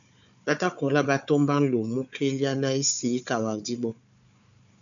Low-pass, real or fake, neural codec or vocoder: 7.2 kHz; fake; codec, 16 kHz, 4 kbps, FunCodec, trained on Chinese and English, 50 frames a second